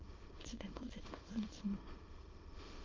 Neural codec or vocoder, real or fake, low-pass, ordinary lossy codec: autoencoder, 22.05 kHz, a latent of 192 numbers a frame, VITS, trained on many speakers; fake; 7.2 kHz; Opus, 24 kbps